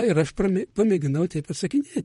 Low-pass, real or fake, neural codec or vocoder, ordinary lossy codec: 19.8 kHz; fake; vocoder, 44.1 kHz, 128 mel bands, Pupu-Vocoder; MP3, 48 kbps